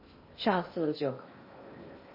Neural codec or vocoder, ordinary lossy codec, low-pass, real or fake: codec, 16 kHz in and 24 kHz out, 0.6 kbps, FocalCodec, streaming, 2048 codes; MP3, 24 kbps; 5.4 kHz; fake